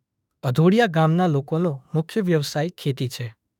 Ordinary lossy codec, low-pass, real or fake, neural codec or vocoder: none; 19.8 kHz; fake; autoencoder, 48 kHz, 32 numbers a frame, DAC-VAE, trained on Japanese speech